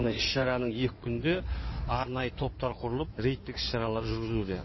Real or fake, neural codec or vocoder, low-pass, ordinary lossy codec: fake; codec, 16 kHz in and 24 kHz out, 2.2 kbps, FireRedTTS-2 codec; 7.2 kHz; MP3, 24 kbps